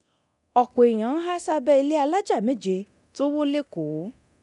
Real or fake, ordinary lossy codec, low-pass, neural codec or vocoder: fake; none; 10.8 kHz; codec, 24 kHz, 0.9 kbps, DualCodec